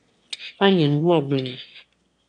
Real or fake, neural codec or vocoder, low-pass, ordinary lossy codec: fake; autoencoder, 22.05 kHz, a latent of 192 numbers a frame, VITS, trained on one speaker; 9.9 kHz; AAC, 64 kbps